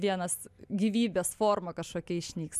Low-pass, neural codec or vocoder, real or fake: 14.4 kHz; none; real